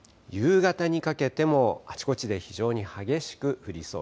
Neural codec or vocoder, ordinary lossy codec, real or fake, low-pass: none; none; real; none